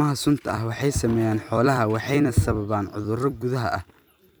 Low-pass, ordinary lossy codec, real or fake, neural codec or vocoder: none; none; fake; vocoder, 44.1 kHz, 128 mel bands every 256 samples, BigVGAN v2